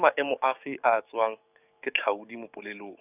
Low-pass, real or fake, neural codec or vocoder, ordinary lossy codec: 3.6 kHz; fake; codec, 44.1 kHz, 7.8 kbps, DAC; none